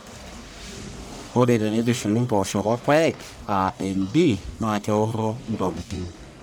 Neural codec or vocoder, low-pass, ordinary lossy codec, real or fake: codec, 44.1 kHz, 1.7 kbps, Pupu-Codec; none; none; fake